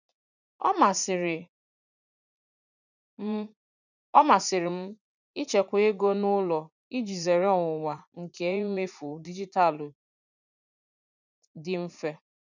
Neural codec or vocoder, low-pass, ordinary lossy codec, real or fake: vocoder, 44.1 kHz, 80 mel bands, Vocos; 7.2 kHz; none; fake